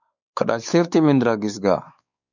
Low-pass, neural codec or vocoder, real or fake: 7.2 kHz; codec, 16 kHz, 4 kbps, X-Codec, WavLM features, trained on Multilingual LibriSpeech; fake